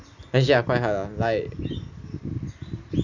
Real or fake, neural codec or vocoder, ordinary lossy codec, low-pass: real; none; none; 7.2 kHz